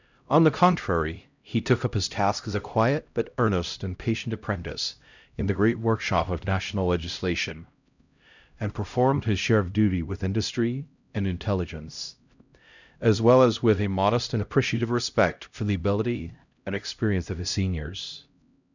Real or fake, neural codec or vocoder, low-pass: fake; codec, 16 kHz, 0.5 kbps, X-Codec, HuBERT features, trained on LibriSpeech; 7.2 kHz